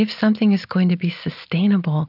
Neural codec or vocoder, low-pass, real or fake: none; 5.4 kHz; real